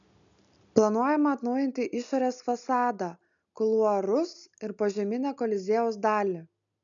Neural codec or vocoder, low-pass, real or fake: none; 7.2 kHz; real